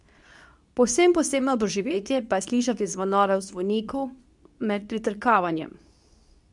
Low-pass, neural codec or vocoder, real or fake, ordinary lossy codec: 10.8 kHz; codec, 24 kHz, 0.9 kbps, WavTokenizer, medium speech release version 2; fake; none